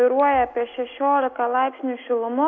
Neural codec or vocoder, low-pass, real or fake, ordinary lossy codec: none; 7.2 kHz; real; AAC, 48 kbps